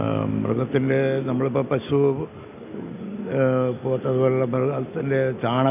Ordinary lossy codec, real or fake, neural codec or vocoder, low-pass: none; real; none; 3.6 kHz